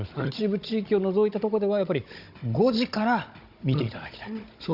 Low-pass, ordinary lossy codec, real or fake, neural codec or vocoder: 5.4 kHz; Opus, 64 kbps; fake; codec, 16 kHz, 16 kbps, FunCodec, trained on Chinese and English, 50 frames a second